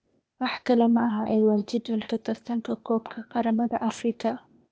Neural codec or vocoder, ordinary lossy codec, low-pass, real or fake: codec, 16 kHz, 0.8 kbps, ZipCodec; none; none; fake